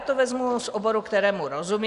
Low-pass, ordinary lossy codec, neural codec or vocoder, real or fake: 10.8 kHz; MP3, 96 kbps; none; real